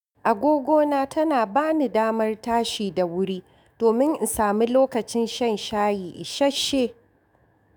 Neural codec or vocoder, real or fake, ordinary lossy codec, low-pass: autoencoder, 48 kHz, 128 numbers a frame, DAC-VAE, trained on Japanese speech; fake; none; none